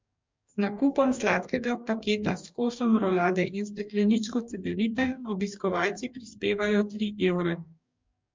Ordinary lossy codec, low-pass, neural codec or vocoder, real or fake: MP3, 64 kbps; 7.2 kHz; codec, 44.1 kHz, 2.6 kbps, DAC; fake